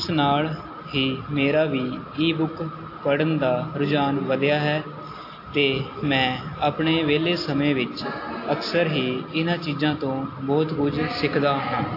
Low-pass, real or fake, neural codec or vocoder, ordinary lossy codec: 5.4 kHz; real; none; AAC, 32 kbps